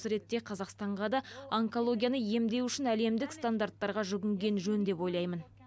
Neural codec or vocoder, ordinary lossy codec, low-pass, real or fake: none; none; none; real